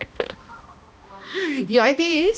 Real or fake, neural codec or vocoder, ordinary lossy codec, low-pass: fake; codec, 16 kHz, 1 kbps, X-Codec, HuBERT features, trained on balanced general audio; none; none